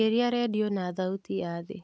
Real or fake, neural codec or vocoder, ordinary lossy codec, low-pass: real; none; none; none